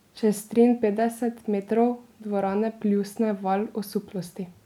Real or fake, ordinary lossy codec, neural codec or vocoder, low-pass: real; none; none; 19.8 kHz